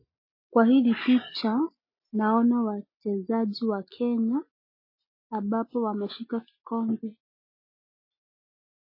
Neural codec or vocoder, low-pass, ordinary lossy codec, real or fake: none; 5.4 kHz; MP3, 24 kbps; real